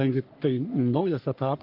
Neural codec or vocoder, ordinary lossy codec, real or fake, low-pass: codec, 44.1 kHz, 3.4 kbps, Pupu-Codec; Opus, 32 kbps; fake; 5.4 kHz